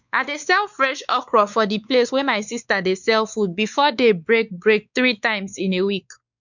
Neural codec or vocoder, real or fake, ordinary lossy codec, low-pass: codec, 16 kHz, 4 kbps, X-Codec, WavLM features, trained on Multilingual LibriSpeech; fake; none; 7.2 kHz